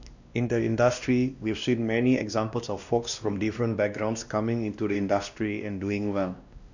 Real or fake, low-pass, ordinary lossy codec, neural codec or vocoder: fake; 7.2 kHz; none; codec, 16 kHz, 1 kbps, X-Codec, WavLM features, trained on Multilingual LibriSpeech